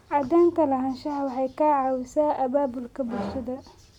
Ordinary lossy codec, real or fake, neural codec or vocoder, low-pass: none; real; none; 19.8 kHz